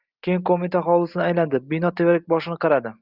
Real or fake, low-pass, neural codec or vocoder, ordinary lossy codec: real; 5.4 kHz; none; Opus, 24 kbps